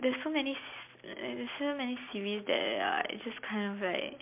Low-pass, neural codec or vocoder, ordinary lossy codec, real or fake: 3.6 kHz; none; MP3, 32 kbps; real